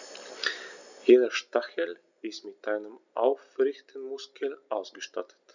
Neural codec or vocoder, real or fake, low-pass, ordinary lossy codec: none; real; 7.2 kHz; none